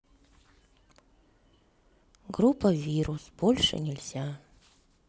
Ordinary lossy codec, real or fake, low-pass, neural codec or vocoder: none; real; none; none